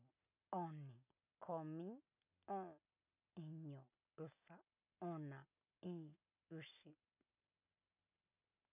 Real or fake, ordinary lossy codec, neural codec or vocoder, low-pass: real; none; none; 3.6 kHz